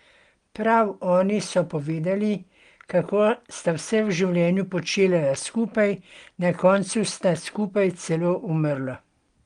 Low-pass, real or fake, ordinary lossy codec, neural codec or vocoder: 9.9 kHz; real; Opus, 24 kbps; none